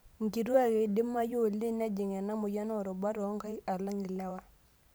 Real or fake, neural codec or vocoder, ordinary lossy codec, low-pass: fake; vocoder, 44.1 kHz, 128 mel bands every 512 samples, BigVGAN v2; none; none